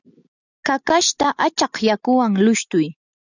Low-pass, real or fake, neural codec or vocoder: 7.2 kHz; real; none